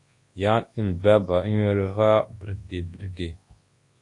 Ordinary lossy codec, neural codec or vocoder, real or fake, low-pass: AAC, 48 kbps; codec, 24 kHz, 0.9 kbps, WavTokenizer, large speech release; fake; 10.8 kHz